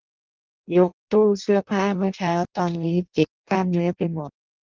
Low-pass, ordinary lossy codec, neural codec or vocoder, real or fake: 7.2 kHz; Opus, 24 kbps; codec, 16 kHz in and 24 kHz out, 0.6 kbps, FireRedTTS-2 codec; fake